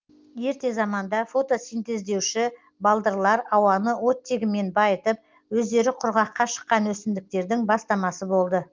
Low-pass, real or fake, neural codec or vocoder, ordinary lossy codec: 7.2 kHz; real; none; Opus, 32 kbps